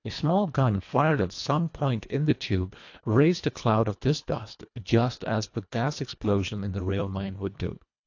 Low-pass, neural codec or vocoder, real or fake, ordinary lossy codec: 7.2 kHz; codec, 24 kHz, 1.5 kbps, HILCodec; fake; AAC, 48 kbps